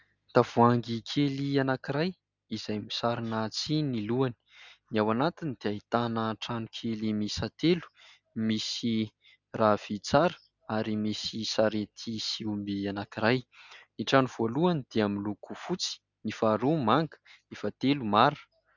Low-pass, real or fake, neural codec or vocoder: 7.2 kHz; real; none